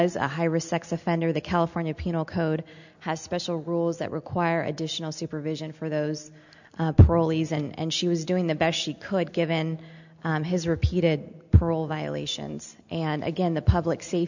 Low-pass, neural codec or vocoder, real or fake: 7.2 kHz; none; real